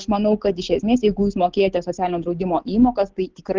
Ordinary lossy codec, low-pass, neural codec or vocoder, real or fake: Opus, 16 kbps; 7.2 kHz; none; real